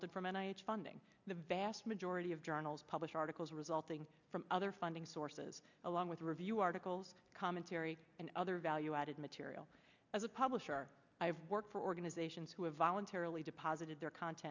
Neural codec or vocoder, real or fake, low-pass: none; real; 7.2 kHz